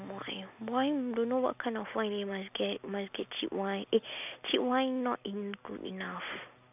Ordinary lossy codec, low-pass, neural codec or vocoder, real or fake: none; 3.6 kHz; none; real